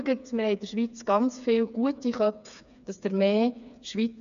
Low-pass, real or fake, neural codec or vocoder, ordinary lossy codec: 7.2 kHz; fake; codec, 16 kHz, 4 kbps, FreqCodec, smaller model; none